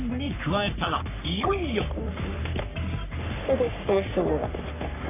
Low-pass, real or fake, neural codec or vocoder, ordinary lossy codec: 3.6 kHz; fake; codec, 44.1 kHz, 3.4 kbps, Pupu-Codec; none